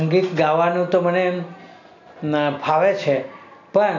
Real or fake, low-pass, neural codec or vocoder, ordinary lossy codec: real; 7.2 kHz; none; none